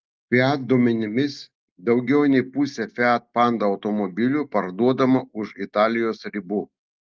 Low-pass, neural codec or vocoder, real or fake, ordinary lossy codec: 7.2 kHz; none; real; Opus, 32 kbps